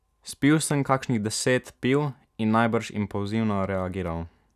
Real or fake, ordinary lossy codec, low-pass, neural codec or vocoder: real; none; 14.4 kHz; none